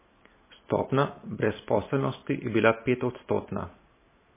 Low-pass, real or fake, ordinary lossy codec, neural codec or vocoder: 3.6 kHz; real; MP3, 16 kbps; none